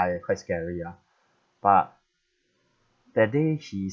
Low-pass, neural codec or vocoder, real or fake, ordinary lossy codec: none; none; real; none